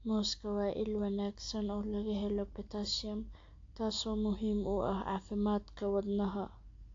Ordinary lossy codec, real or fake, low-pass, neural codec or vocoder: AAC, 48 kbps; real; 7.2 kHz; none